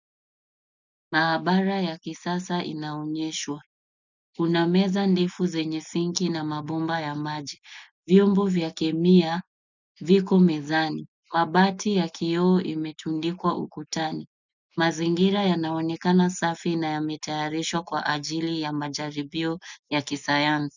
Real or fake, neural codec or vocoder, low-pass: real; none; 7.2 kHz